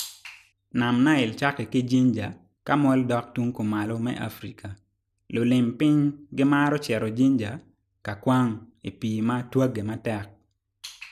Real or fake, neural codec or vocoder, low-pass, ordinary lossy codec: real; none; 14.4 kHz; none